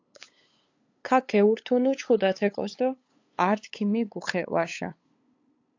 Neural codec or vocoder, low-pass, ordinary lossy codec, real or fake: codec, 16 kHz, 8 kbps, FunCodec, trained on LibriTTS, 25 frames a second; 7.2 kHz; AAC, 48 kbps; fake